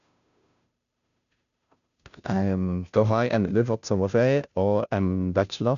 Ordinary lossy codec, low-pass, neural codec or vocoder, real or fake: none; 7.2 kHz; codec, 16 kHz, 0.5 kbps, FunCodec, trained on Chinese and English, 25 frames a second; fake